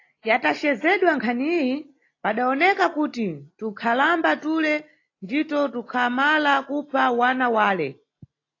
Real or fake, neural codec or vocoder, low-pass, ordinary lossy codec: real; none; 7.2 kHz; AAC, 32 kbps